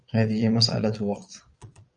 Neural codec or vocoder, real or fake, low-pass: vocoder, 22.05 kHz, 80 mel bands, Vocos; fake; 9.9 kHz